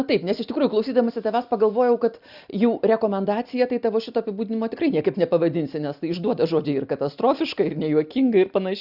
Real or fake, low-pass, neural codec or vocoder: real; 5.4 kHz; none